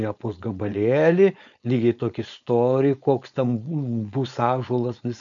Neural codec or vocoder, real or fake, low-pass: codec, 16 kHz, 4.8 kbps, FACodec; fake; 7.2 kHz